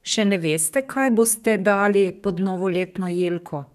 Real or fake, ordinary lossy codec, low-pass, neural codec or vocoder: fake; none; 14.4 kHz; codec, 32 kHz, 1.9 kbps, SNAC